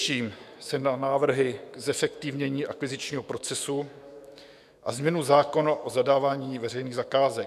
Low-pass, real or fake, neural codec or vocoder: 14.4 kHz; fake; autoencoder, 48 kHz, 128 numbers a frame, DAC-VAE, trained on Japanese speech